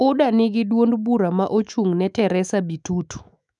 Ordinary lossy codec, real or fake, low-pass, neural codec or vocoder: none; fake; 10.8 kHz; autoencoder, 48 kHz, 128 numbers a frame, DAC-VAE, trained on Japanese speech